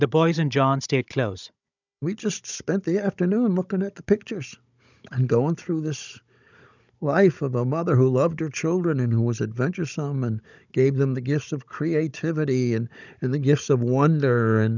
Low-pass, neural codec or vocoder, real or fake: 7.2 kHz; codec, 16 kHz, 16 kbps, FunCodec, trained on Chinese and English, 50 frames a second; fake